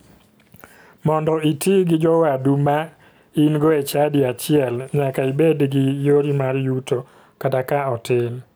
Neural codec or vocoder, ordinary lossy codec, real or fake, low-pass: none; none; real; none